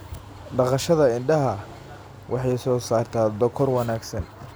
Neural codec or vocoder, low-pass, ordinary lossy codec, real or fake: none; none; none; real